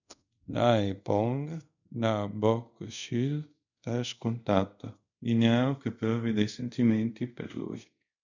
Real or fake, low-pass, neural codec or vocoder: fake; 7.2 kHz; codec, 24 kHz, 0.5 kbps, DualCodec